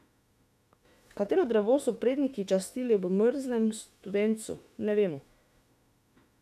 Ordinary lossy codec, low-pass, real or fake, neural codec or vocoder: none; 14.4 kHz; fake; autoencoder, 48 kHz, 32 numbers a frame, DAC-VAE, trained on Japanese speech